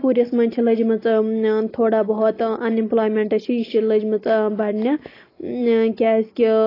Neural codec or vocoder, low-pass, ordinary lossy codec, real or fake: none; 5.4 kHz; AAC, 24 kbps; real